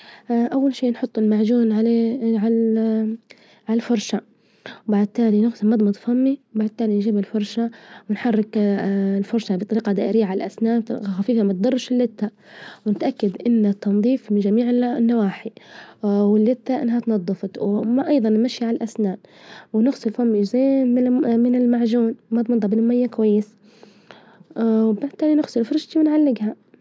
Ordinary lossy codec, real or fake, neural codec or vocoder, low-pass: none; real; none; none